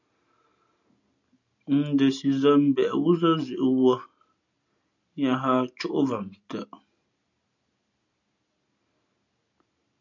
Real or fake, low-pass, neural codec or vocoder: real; 7.2 kHz; none